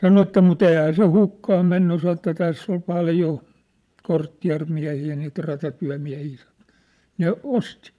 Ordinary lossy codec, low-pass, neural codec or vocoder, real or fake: none; none; vocoder, 22.05 kHz, 80 mel bands, Vocos; fake